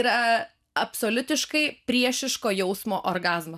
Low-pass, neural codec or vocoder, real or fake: 14.4 kHz; none; real